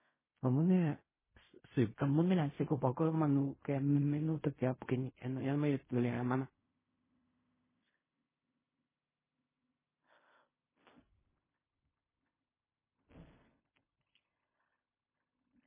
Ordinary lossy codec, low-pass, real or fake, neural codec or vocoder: MP3, 16 kbps; 3.6 kHz; fake; codec, 16 kHz in and 24 kHz out, 0.4 kbps, LongCat-Audio-Codec, fine tuned four codebook decoder